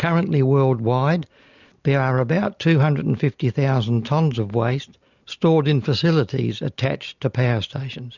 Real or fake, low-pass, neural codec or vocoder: real; 7.2 kHz; none